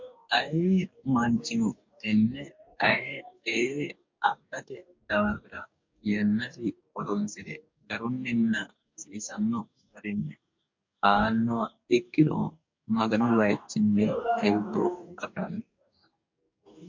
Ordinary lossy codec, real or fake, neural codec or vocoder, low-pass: MP3, 48 kbps; fake; codec, 44.1 kHz, 2.6 kbps, DAC; 7.2 kHz